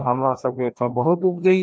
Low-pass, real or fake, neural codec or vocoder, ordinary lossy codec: none; fake; codec, 16 kHz, 1 kbps, FreqCodec, larger model; none